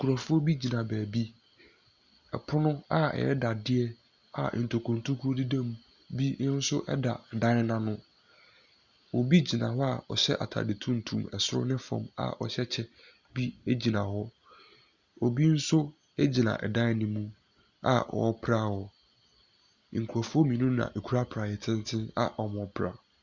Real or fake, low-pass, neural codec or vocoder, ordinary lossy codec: real; 7.2 kHz; none; Opus, 64 kbps